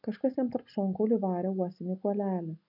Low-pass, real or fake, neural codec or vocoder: 5.4 kHz; real; none